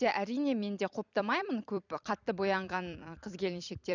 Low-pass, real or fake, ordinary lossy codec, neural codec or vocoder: 7.2 kHz; fake; none; vocoder, 44.1 kHz, 128 mel bands every 256 samples, BigVGAN v2